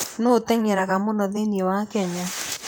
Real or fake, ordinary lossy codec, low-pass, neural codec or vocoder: fake; none; none; vocoder, 44.1 kHz, 128 mel bands, Pupu-Vocoder